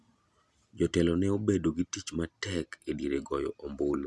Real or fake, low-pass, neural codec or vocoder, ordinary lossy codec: real; none; none; none